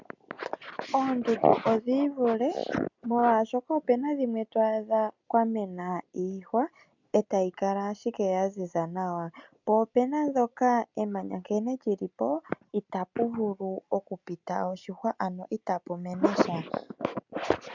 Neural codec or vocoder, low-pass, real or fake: none; 7.2 kHz; real